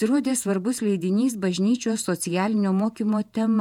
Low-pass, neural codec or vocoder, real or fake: 19.8 kHz; vocoder, 48 kHz, 128 mel bands, Vocos; fake